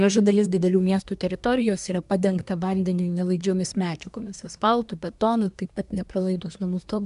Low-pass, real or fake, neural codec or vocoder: 10.8 kHz; fake; codec, 24 kHz, 1 kbps, SNAC